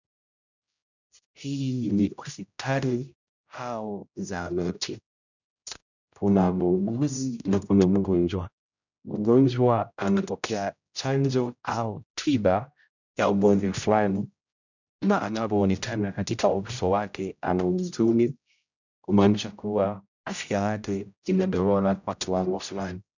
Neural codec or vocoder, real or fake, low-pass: codec, 16 kHz, 0.5 kbps, X-Codec, HuBERT features, trained on general audio; fake; 7.2 kHz